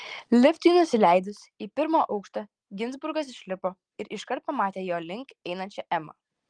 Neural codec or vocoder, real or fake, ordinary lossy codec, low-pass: none; real; Opus, 24 kbps; 9.9 kHz